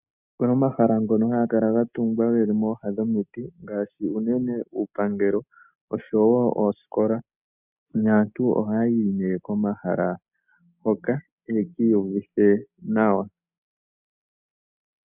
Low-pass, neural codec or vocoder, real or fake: 3.6 kHz; none; real